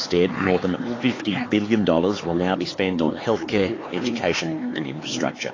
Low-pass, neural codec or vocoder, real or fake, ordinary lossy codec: 7.2 kHz; codec, 16 kHz, 4 kbps, X-Codec, HuBERT features, trained on LibriSpeech; fake; AAC, 32 kbps